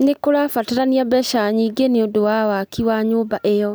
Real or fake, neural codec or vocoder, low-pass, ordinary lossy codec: real; none; none; none